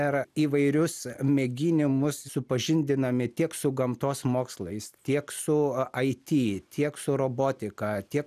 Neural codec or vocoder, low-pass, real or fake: none; 14.4 kHz; real